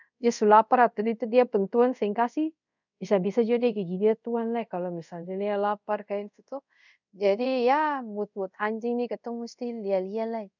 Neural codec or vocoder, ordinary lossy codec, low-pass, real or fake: codec, 24 kHz, 0.5 kbps, DualCodec; none; 7.2 kHz; fake